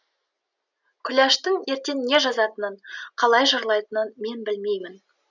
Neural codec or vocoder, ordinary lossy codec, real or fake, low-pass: none; none; real; 7.2 kHz